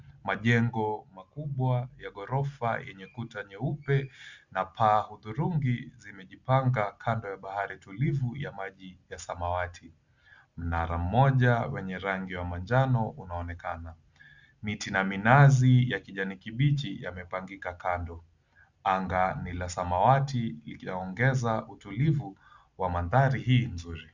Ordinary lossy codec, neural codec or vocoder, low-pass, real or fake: Opus, 64 kbps; none; 7.2 kHz; real